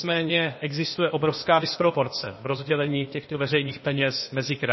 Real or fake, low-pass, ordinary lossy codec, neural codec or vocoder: fake; 7.2 kHz; MP3, 24 kbps; codec, 16 kHz, 0.8 kbps, ZipCodec